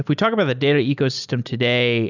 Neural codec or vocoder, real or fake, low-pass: none; real; 7.2 kHz